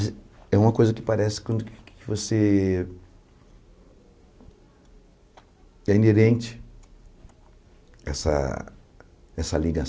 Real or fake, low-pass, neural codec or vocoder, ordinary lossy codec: real; none; none; none